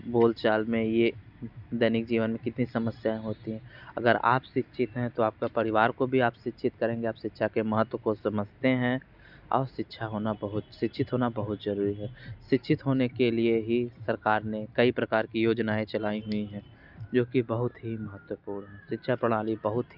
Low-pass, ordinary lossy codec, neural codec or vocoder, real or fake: 5.4 kHz; none; none; real